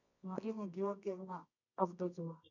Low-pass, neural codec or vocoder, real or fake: 7.2 kHz; codec, 24 kHz, 0.9 kbps, WavTokenizer, medium music audio release; fake